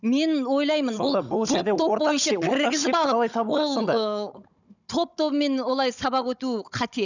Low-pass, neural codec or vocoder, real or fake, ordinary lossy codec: 7.2 kHz; codec, 16 kHz, 16 kbps, FunCodec, trained on Chinese and English, 50 frames a second; fake; none